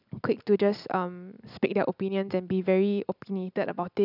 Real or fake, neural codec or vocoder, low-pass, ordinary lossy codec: real; none; 5.4 kHz; none